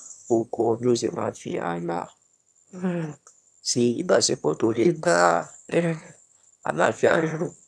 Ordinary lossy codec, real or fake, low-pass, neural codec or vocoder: none; fake; none; autoencoder, 22.05 kHz, a latent of 192 numbers a frame, VITS, trained on one speaker